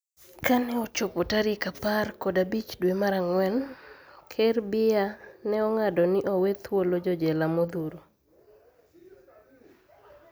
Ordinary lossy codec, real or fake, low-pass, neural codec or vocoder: none; real; none; none